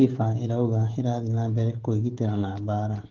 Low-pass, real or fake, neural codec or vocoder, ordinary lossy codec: 7.2 kHz; fake; codec, 16 kHz, 16 kbps, FreqCodec, smaller model; Opus, 16 kbps